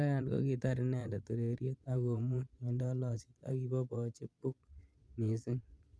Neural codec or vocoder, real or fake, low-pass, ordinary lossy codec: vocoder, 22.05 kHz, 80 mel bands, Vocos; fake; none; none